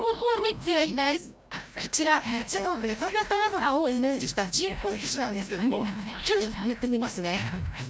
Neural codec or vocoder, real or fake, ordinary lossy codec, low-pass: codec, 16 kHz, 0.5 kbps, FreqCodec, larger model; fake; none; none